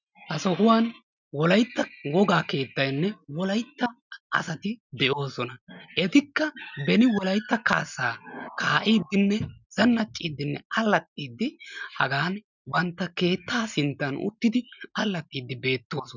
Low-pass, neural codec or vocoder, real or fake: 7.2 kHz; none; real